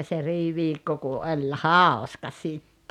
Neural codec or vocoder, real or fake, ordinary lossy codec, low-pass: none; real; none; 19.8 kHz